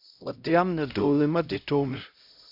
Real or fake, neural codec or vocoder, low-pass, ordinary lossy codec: fake; codec, 16 kHz, 0.5 kbps, X-Codec, HuBERT features, trained on LibriSpeech; 5.4 kHz; Opus, 64 kbps